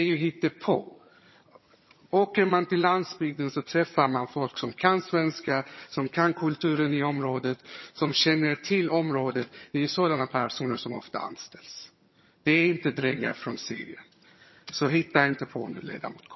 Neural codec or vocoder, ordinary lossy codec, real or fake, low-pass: vocoder, 22.05 kHz, 80 mel bands, HiFi-GAN; MP3, 24 kbps; fake; 7.2 kHz